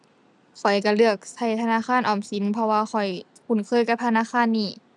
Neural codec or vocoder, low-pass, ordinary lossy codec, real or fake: none; none; none; real